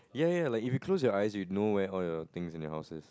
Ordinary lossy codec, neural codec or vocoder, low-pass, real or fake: none; none; none; real